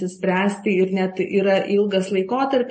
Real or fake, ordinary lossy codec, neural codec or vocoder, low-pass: real; MP3, 32 kbps; none; 9.9 kHz